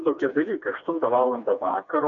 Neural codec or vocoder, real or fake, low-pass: codec, 16 kHz, 2 kbps, FreqCodec, smaller model; fake; 7.2 kHz